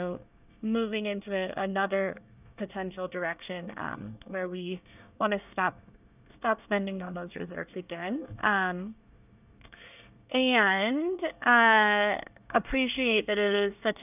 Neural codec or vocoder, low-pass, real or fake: codec, 24 kHz, 1 kbps, SNAC; 3.6 kHz; fake